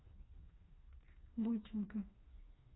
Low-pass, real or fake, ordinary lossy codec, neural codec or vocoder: 7.2 kHz; fake; AAC, 16 kbps; codec, 16 kHz, 2 kbps, FreqCodec, smaller model